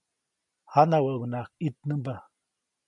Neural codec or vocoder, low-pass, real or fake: none; 10.8 kHz; real